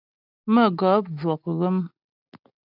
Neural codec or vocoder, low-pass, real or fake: none; 5.4 kHz; real